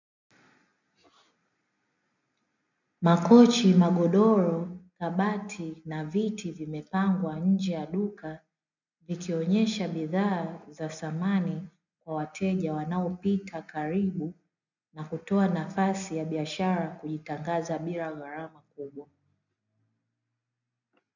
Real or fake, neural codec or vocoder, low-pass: real; none; 7.2 kHz